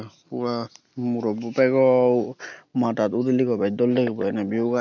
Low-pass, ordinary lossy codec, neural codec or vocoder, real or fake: 7.2 kHz; none; none; real